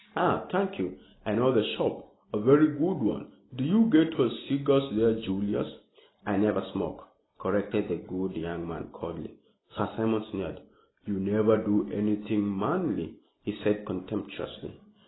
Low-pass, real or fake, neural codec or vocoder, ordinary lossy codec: 7.2 kHz; real; none; AAC, 16 kbps